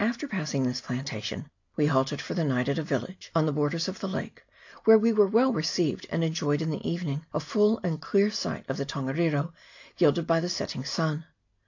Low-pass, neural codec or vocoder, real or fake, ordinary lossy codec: 7.2 kHz; none; real; AAC, 48 kbps